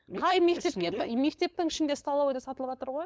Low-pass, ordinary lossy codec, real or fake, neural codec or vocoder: none; none; fake; codec, 16 kHz, 4.8 kbps, FACodec